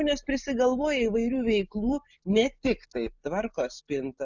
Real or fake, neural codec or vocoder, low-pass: real; none; 7.2 kHz